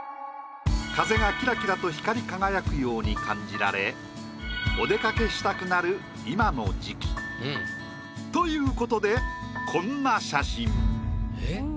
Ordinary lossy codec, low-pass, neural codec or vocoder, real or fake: none; none; none; real